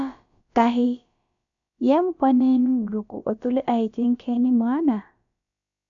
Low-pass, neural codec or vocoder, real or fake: 7.2 kHz; codec, 16 kHz, about 1 kbps, DyCAST, with the encoder's durations; fake